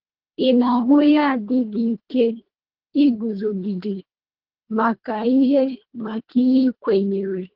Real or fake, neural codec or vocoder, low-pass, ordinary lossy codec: fake; codec, 24 kHz, 1.5 kbps, HILCodec; 5.4 kHz; Opus, 16 kbps